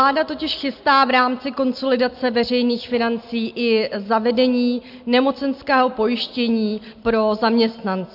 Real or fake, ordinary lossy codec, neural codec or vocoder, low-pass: real; MP3, 48 kbps; none; 5.4 kHz